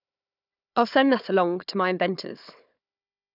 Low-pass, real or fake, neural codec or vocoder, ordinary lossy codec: 5.4 kHz; fake; codec, 16 kHz, 4 kbps, FunCodec, trained on Chinese and English, 50 frames a second; none